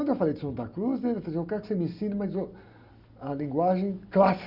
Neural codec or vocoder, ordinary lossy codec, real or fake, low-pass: none; Opus, 64 kbps; real; 5.4 kHz